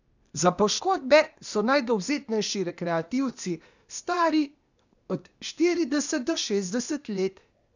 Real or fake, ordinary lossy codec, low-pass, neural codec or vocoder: fake; none; 7.2 kHz; codec, 16 kHz, 0.8 kbps, ZipCodec